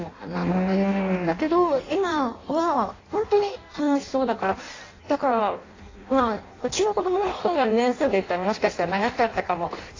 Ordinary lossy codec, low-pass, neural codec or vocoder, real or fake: AAC, 32 kbps; 7.2 kHz; codec, 16 kHz in and 24 kHz out, 0.6 kbps, FireRedTTS-2 codec; fake